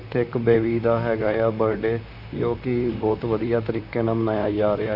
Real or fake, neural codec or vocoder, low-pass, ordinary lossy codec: fake; vocoder, 44.1 kHz, 128 mel bands, Pupu-Vocoder; 5.4 kHz; none